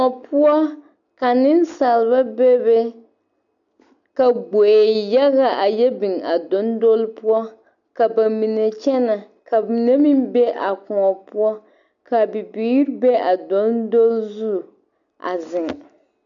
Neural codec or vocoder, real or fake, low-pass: none; real; 7.2 kHz